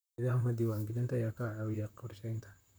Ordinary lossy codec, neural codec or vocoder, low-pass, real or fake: none; vocoder, 44.1 kHz, 128 mel bands, Pupu-Vocoder; none; fake